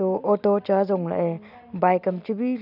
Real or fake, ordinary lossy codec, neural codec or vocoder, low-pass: real; none; none; 5.4 kHz